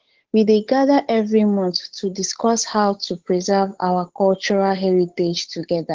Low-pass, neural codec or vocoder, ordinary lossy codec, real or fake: 7.2 kHz; codec, 16 kHz, 8 kbps, FunCodec, trained on Chinese and English, 25 frames a second; Opus, 16 kbps; fake